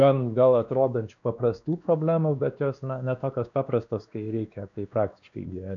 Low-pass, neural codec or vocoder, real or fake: 7.2 kHz; codec, 16 kHz, 2 kbps, X-Codec, WavLM features, trained on Multilingual LibriSpeech; fake